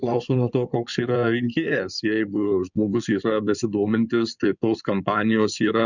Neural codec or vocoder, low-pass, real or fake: codec, 16 kHz in and 24 kHz out, 2.2 kbps, FireRedTTS-2 codec; 7.2 kHz; fake